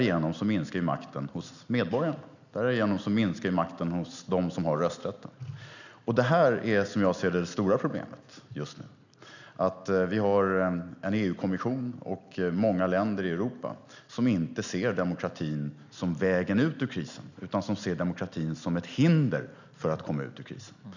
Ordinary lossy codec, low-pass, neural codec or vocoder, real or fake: none; 7.2 kHz; none; real